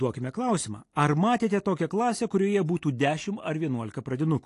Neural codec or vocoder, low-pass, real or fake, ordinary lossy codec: none; 10.8 kHz; real; AAC, 48 kbps